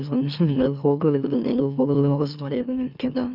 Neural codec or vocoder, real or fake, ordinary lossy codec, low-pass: autoencoder, 44.1 kHz, a latent of 192 numbers a frame, MeloTTS; fake; none; 5.4 kHz